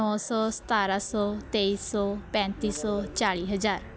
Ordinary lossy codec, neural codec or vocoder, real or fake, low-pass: none; none; real; none